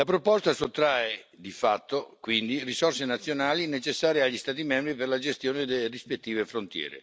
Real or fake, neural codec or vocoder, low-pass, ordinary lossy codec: real; none; none; none